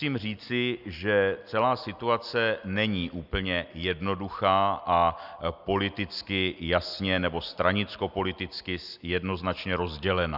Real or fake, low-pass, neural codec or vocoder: real; 5.4 kHz; none